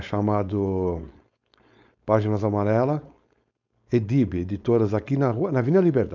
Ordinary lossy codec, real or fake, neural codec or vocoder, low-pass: none; fake; codec, 16 kHz, 4.8 kbps, FACodec; 7.2 kHz